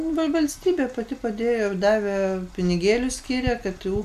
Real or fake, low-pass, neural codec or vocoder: real; 14.4 kHz; none